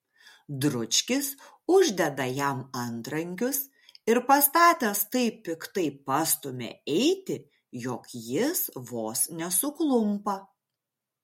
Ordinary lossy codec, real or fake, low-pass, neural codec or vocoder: MP3, 64 kbps; real; 19.8 kHz; none